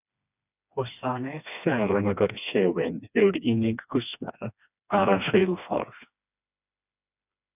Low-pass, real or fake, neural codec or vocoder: 3.6 kHz; fake; codec, 16 kHz, 2 kbps, FreqCodec, smaller model